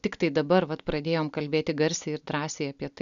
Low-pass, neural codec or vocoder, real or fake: 7.2 kHz; none; real